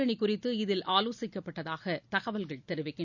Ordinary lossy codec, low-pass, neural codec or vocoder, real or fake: none; 7.2 kHz; none; real